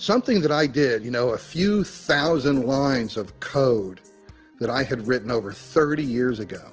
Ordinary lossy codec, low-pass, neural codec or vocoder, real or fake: Opus, 16 kbps; 7.2 kHz; none; real